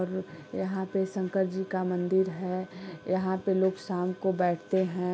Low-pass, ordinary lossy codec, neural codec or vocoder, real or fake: none; none; none; real